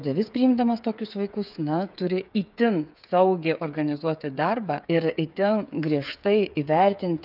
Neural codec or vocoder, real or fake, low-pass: codec, 16 kHz, 8 kbps, FreqCodec, smaller model; fake; 5.4 kHz